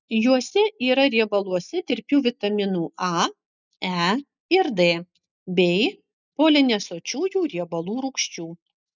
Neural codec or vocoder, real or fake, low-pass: none; real; 7.2 kHz